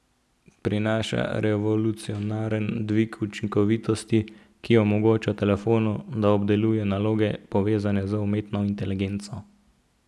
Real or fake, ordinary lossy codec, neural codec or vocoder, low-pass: real; none; none; none